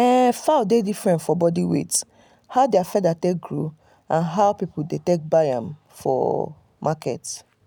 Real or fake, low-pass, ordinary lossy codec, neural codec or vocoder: real; none; none; none